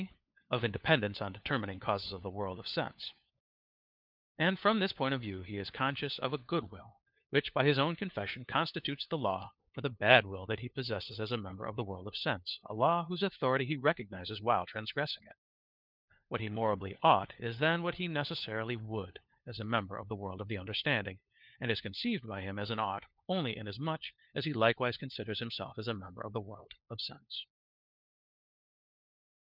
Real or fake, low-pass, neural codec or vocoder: fake; 5.4 kHz; codec, 16 kHz, 4 kbps, FunCodec, trained on LibriTTS, 50 frames a second